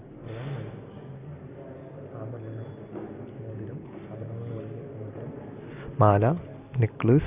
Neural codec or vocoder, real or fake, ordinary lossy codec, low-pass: none; real; Opus, 64 kbps; 3.6 kHz